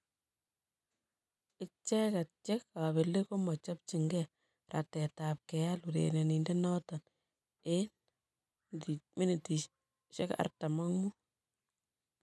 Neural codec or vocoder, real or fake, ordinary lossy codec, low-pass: none; real; none; none